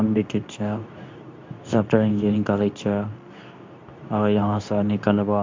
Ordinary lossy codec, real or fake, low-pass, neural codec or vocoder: MP3, 64 kbps; fake; 7.2 kHz; codec, 24 kHz, 0.9 kbps, WavTokenizer, medium speech release version 2